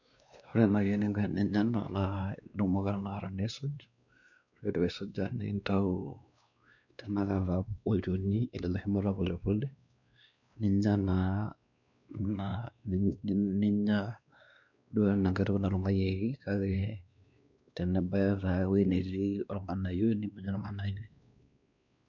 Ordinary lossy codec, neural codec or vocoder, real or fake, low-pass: none; codec, 16 kHz, 2 kbps, X-Codec, WavLM features, trained on Multilingual LibriSpeech; fake; 7.2 kHz